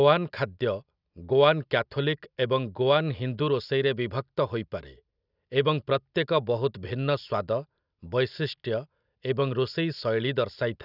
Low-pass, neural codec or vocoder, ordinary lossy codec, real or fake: 5.4 kHz; none; none; real